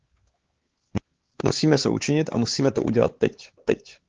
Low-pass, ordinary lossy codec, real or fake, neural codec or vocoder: 7.2 kHz; Opus, 16 kbps; fake; codec, 16 kHz, 4 kbps, X-Codec, HuBERT features, trained on LibriSpeech